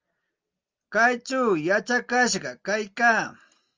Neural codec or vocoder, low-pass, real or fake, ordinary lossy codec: none; 7.2 kHz; real; Opus, 32 kbps